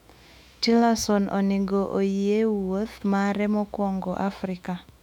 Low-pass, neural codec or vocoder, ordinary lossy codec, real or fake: 19.8 kHz; autoencoder, 48 kHz, 128 numbers a frame, DAC-VAE, trained on Japanese speech; none; fake